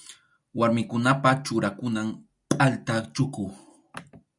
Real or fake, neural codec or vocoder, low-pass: real; none; 10.8 kHz